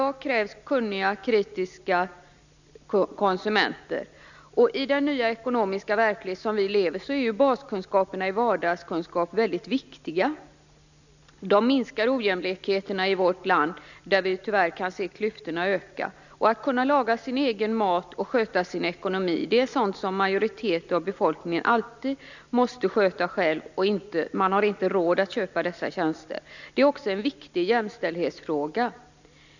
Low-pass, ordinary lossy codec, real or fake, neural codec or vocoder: 7.2 kHz; none; real; none